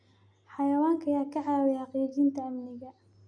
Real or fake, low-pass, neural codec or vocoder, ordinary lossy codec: real; 9.9 kHz; none; none